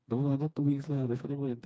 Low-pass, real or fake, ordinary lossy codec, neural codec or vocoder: none; fake; none; codec, 16 kHz, 2 kbps, FreqCodec, smaller model